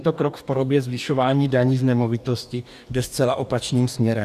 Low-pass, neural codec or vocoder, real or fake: 14.4 kHz; codec, 44.1 kHz, 2.6 kbps, DAC; fake